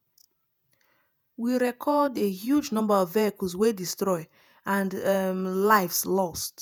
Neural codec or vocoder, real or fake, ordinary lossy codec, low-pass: vocoder, 48 kHz, 128 mel bands, Vocos; fake; none; none